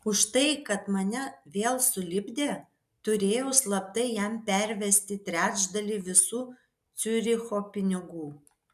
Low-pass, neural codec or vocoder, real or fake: 14.4 kHz; none; real